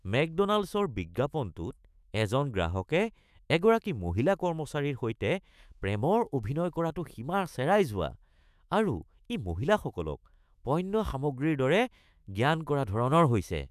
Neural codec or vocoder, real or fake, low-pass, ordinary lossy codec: autoencoder, 48 kHz, 128 numbers a frame, DAC-VAE, trained on Japanese speech; fake; 14.4 kHz; none